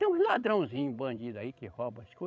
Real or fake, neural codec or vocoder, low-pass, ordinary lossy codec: fake; codec, 16 kHz, 16 kbps, FreqCodec, larger model; none; none